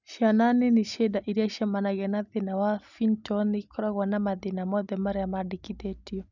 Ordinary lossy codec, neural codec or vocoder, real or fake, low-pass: none; none; real; 7.2 kHz